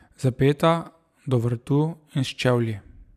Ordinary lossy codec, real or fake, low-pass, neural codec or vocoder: none; fake; 14.4 kHz; vocoder, 44.1 kHz, 128 mel bands every 256 samples, BigVGAN v2